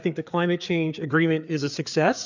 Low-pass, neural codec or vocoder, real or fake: 7.2 kHz; codec, 16 kHz, 4 kbps, FreqCodec, larger model; fake